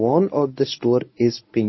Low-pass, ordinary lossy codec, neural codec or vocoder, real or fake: 7.2 kHz; MP3, 24 kbps; codec, 24 kHz, 0.9 kbps, WavTokenizer, medium speech release version 1; fake